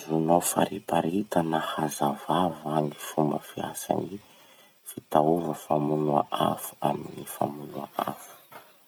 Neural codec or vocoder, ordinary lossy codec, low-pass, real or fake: none; none; none; real